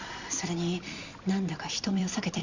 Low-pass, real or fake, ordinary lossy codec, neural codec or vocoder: 7.2 kHz; fake; Opus, 64 kbps; vocoder, 44.1 kHz, 128 mel bands every 256 samples, BigVGAN v2